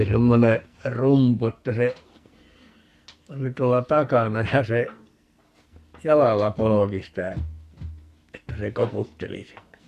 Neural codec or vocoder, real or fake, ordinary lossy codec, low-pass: codec, 44.1 kHz, 2.6 kbps, SNAC; fake; Opus, 64 kbps; 14.4 kHz